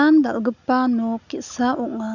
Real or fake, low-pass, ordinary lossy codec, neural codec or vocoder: real; 7.2 kHz; none; none